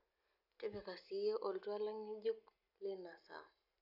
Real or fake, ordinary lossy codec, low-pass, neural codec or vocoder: real; none; 5.4 kHz; none